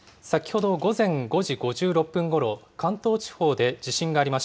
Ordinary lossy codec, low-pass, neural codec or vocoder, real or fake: none; none; none; real